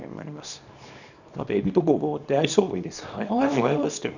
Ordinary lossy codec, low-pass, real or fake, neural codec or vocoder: none; 7.2 kHz; fake; codec, 24 kHz, 0.9 kbps, WavTokenizer, small release